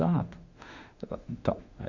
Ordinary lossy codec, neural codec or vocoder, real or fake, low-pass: Opus, 64 kbps; autoencoder, 48 kHz, 32 numbers a frame, DAC-VAE, trained on Japanese speech; fake; 7.2 kHz